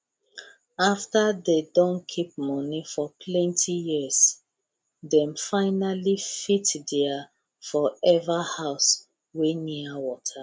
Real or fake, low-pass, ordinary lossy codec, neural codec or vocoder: real; none; none; none